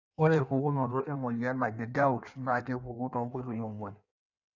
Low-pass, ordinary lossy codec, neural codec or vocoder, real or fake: 7.2 kHz; none; codec, 16 kHz in and 24 kHz out, 1.1 kbps, FireRedTTS-2 codec; fake